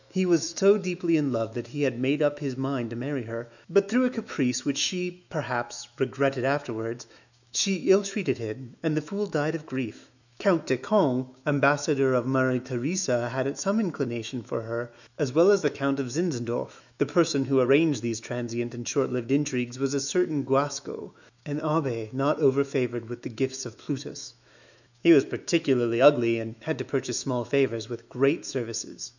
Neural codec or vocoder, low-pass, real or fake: autoencoder, 48 kHz, 128 numbers a frame, DAC-VAE, trained on Japanese speech; 7.2 kHz; fake